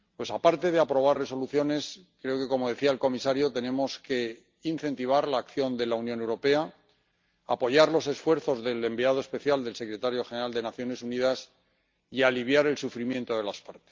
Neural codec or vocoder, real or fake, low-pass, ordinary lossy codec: none; real; 7.2 kHz; Opus, 24 kbps